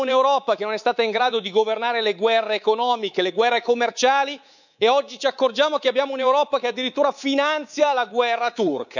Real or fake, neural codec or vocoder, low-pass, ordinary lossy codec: fake; codec, 24 kHz, 3.1 kbps, DualCodec; 7.2 kHz; none